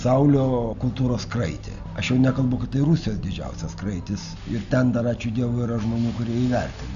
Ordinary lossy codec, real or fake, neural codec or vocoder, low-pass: AAC, 96 kbps; real; none; 7.2 kHz